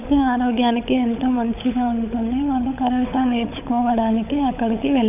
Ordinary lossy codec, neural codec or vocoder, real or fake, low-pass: none; codec, 16 kHz, 16 kbps, FunCodec, trained on LibriTTS, 50 frames a second; fake; 3.6 kHz